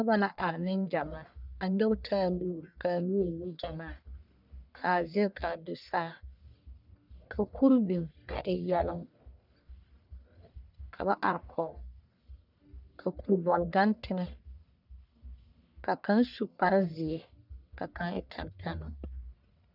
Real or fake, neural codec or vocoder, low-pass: fake; codec, 44.1 kHz, 1.7 kbps, Pupu-Codec; 5.4 kHz